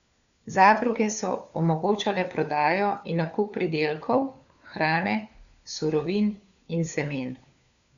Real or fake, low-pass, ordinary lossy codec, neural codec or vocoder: fake; 7.2 kHz; none; codec, 16 kHz, 4 kbps, FunCodec, trained on LibriTTS, 50 frames a second